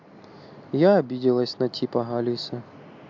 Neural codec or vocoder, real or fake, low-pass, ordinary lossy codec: none; real; 7.2 kHz; MP3, 64 kbps